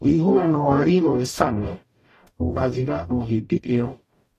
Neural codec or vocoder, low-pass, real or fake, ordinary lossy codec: codec, 44.1 kHz, 0.9 kbps, DAC; 14.4 kHz; fake; AAC, 48 kbps